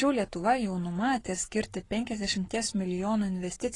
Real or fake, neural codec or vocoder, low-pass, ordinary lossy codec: fake; codec, 44.1 kHz, 7.8 kbps, Pupu-Codec; 10.8 kHz; AAC, 32 kbps